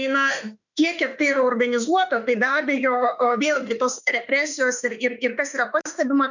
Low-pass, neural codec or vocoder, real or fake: 7.2 kHz; autoencoder, 48 kHz, 32 numbers a frame, DAC-VAE, trained on Japanese speech; fake